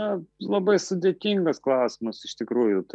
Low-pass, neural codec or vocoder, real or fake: 10.8 kHz; none; real